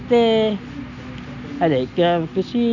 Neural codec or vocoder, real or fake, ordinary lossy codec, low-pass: none; real; none; 7.2 kHz